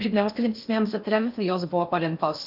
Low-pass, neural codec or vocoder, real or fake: 5.4 kHz; codec, 16 kHz in and 24 kHz out, 0.6 kbps, FocalCodec, streaming, 4096 codes; fake